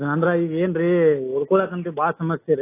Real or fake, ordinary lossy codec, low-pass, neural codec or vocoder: real; AAC, 24 kbps; 3.6 kHz; none